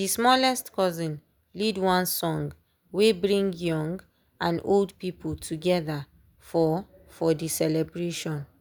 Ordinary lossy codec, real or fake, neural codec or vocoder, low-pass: none; real; none; none